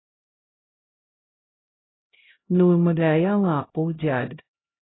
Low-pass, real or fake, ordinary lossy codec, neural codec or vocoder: 7.2 kHz; fake; AAC, 16 kbps; codec, 16 kHz, 0.5 kbps, X-Codec, HuBERT features, trained on LibriSpeech